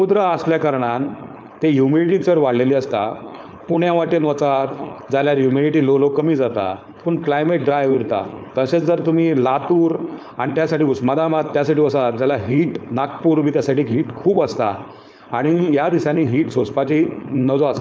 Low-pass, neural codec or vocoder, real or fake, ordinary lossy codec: none; codec, 16 kHz, 4.8 kbps, FACodec; fake; none